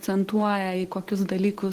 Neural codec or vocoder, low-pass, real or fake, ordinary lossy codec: none; 14.4 kHz; real; Opus, 16 kbps